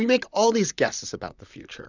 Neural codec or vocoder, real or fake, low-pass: vocoder, 44.1 kHz, 128 mel bands, Pupu-Vocoder; fake; 7.2 kHz